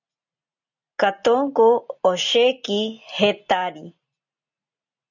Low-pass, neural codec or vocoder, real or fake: 7.2 kHz; none; real